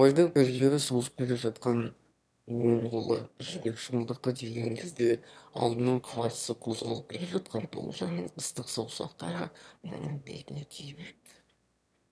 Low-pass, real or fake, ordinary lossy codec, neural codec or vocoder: none; fake; none; autoencoder, 22.05 kHz, a latent of 192 numbers a frame, VITS, trained on one speaker